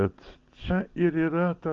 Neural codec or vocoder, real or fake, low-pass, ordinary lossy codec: none; real; 7.2 kHz; Opus, 32 kbps